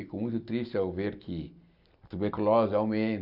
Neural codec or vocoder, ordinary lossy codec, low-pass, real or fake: none; none; 5.4 kHz; real